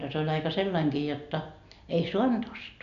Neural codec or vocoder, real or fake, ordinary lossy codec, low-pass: none; real; none; 7.2 kHz